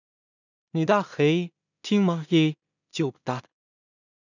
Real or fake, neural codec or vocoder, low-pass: fake; codec, 16 kHz in and 24 kHz out, 0.4 kbps, LongCat-Audio-Codec, two codebook decoder; 7.2 kHz